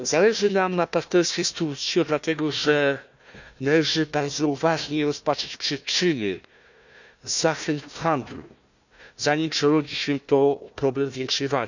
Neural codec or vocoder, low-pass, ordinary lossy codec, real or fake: codec, 16 kHz, 1 kbps, FunCodec, trained on Chinese and English, 50 frames a second; 7.2 kHz; none; fake